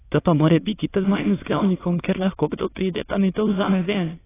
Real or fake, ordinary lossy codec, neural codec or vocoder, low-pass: fake; AAC, 16 kbps; autoencoder, 22.05 kHz, a latent of 192 numbers a frame, VITS, trained on many speakers; 3.6 kHz